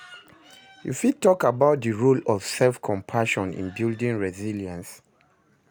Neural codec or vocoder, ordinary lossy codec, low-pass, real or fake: none; none; none; real